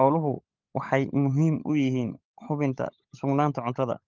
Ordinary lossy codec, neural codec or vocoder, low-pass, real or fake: Opus, 32 kbps; codec, 16 kHz, 16 kbps, FunCodec, trained on LibriTTS, 50 frames a second; 7.2 kHz; fake